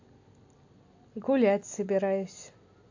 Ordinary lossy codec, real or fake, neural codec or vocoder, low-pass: AAC, 48 kbps; real; none; 7.2 kHz